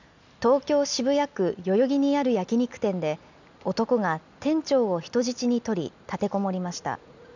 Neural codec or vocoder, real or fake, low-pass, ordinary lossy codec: none; real; 7.2 kHz; none